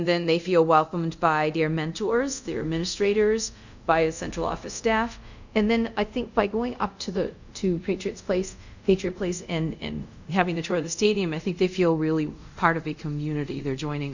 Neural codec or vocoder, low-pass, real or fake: codec, 24 kHz, 0.5 kbps, DualCodec; 7.2 kHz; fake